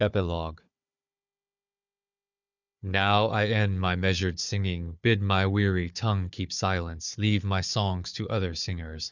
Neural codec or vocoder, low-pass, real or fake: codec, 16 kHz, 4 kbps, FunCodec, trained on Chinese and English, 50 frames a second; 7.2 kHz; fake